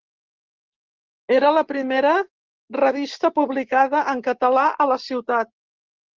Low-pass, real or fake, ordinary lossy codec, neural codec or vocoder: 7.2 kHz; real; Opus, 16 kbps; none